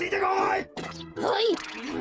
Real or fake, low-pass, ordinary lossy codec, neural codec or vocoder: fake; none; none; codec, 16 kHz, 8 kbps, FreqCodec, smaller model